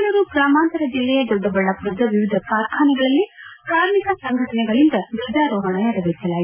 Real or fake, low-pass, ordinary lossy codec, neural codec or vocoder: real; 3.6 kHz; none; none